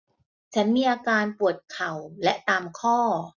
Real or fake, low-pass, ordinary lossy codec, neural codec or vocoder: real; 7.2 kHz; none; none